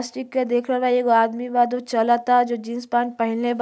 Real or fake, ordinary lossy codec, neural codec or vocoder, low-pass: real; none; none; none